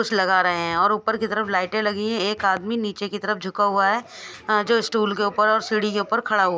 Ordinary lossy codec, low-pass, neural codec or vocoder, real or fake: none; none; none; real